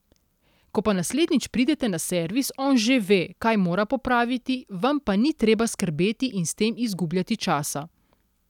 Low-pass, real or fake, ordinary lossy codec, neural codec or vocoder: 19.8 kHz; real; none; none